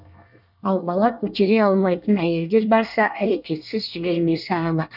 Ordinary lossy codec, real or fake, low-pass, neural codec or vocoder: none; fake; 5.4 kHz; codec, 24 kHz, 1 kbps, SNAC